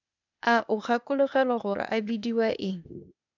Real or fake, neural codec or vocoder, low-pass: fake; codec, 16 kHz, 0.8 kbps, ZipCodec; 7.2 kHz